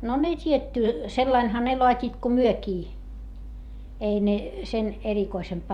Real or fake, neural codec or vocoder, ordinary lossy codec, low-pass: fake; vocoder, 44.1 kHz, 128 mel bands every 512 samples, BigVGAN v2; Opus, 64 kbps; 19.8 kHz